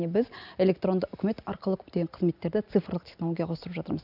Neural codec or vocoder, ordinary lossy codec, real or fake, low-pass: none; none; real; 5.4 kHz